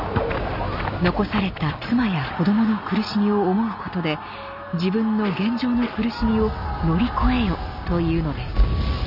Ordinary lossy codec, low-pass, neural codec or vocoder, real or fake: none; 5.4 kHz; none; real